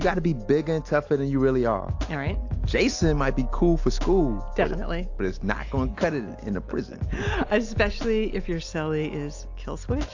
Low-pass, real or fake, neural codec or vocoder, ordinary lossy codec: 7.2 kHz; real; none; AAC, 48 kbps